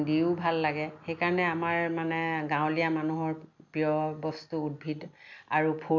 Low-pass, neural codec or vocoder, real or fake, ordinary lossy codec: 7.2 kHz; none; real; none